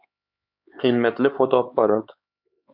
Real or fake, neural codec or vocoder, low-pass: fake; codec, 16 kHz, 4 kbps, X-Codec, HuBERT features, trained on LibriSpeech; 5.4 kHz